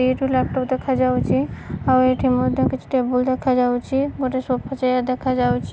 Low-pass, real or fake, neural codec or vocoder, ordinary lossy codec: none; real; none; none